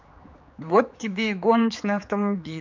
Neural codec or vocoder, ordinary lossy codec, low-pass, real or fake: codec, 16 kHz, 4 kbps, X-Codec, HuBERT features, trained on general audio; MP3, 64 kbps; 7.2 kHz; fake